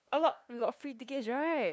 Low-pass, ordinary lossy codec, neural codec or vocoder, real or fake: none; none; codec, 16 kHz, 2 kbps, FunCodec, trained on LibriTTS, 25 frames a second; fake